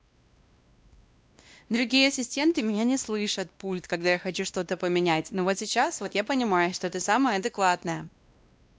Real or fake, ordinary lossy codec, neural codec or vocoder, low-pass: fake; none; codec, 16 kHz, 1 kbps, X-Codec, WavLM features, trained on Multilingual LibriSpeech; none